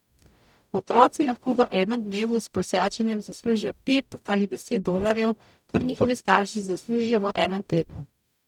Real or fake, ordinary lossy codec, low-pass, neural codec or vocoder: fake; none; 19.8 kHz; codec, 44.1 kHz, 0.9 kbps, DAC